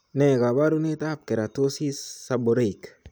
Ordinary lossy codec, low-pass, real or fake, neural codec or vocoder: none; none; real; none